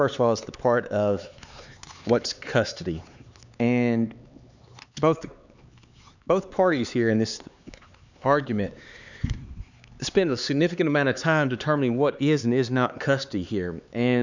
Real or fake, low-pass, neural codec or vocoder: fake; 7.2 kHz; codec, 16 kHz, 4 kbps, X-Codec, HuBERT features, trained on LibriSpeech